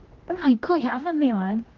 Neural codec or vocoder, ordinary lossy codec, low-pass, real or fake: codec, 16 kHz, 1 kbps, X-Codec, HuBERT features, trained on general audio; Opus, 16 kbps; 7.2 kHz; fake